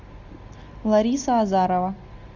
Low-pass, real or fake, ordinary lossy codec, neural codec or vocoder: 7.2 kHz; real; Opus, 64 kbps; none